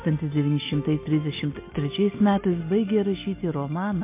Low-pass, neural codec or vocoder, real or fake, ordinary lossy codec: 3.6 kHz; none; real; MP3, 24 kbps